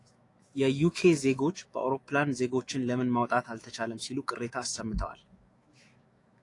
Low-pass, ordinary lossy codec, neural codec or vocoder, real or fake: 10.8 kHz; AAC, 48 kbps; autoencoder, 48 kHz, 128 numbers a frame, DAC-VAE, trained on Japanese speech; fake